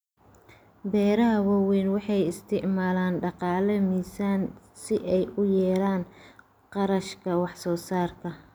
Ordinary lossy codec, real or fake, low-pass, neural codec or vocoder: none; real; none; none